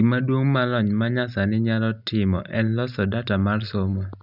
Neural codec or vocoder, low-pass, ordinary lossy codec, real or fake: none; 5.4 kHz; none; real